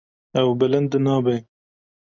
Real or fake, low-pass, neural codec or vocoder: real; 7.2 kHz; none